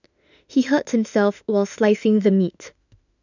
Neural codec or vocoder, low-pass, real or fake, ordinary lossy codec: autoencoder, 48 kHz, 32 numbers a frame, DAC-VAE, trained on Japanese speech; 7.2 kHz; fake; none